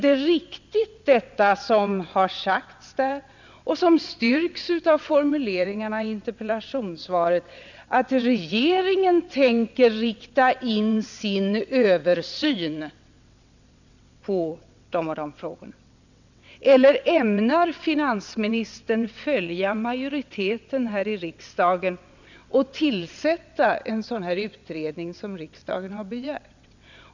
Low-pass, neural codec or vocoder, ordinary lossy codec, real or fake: 7.2 kHz; vocoder, 22.05 kHz, 80 mel bands, WaveNeXt; none; fake